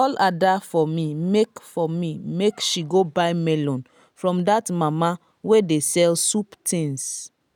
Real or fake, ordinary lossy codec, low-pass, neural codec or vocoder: real; none; none; none